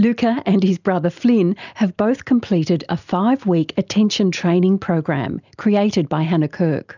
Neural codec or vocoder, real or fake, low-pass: none; real; 7.2 kHz